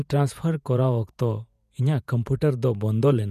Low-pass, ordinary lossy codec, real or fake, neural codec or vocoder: 14.4 kHz; none; fake; vocoder, 48 kHz, 128 mel bands, Vocos